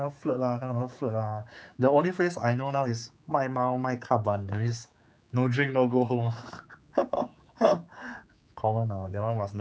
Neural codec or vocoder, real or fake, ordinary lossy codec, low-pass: codec, 16 kHz, 4 kbps, X-Codec, HuBERT features, trained on general audio; fake; none; none